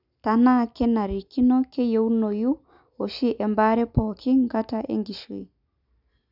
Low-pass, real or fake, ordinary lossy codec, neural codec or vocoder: 5.4 kHz; real; none; none